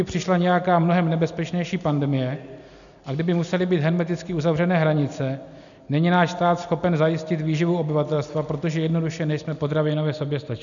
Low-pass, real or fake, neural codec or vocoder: 7.2 kHz; real; none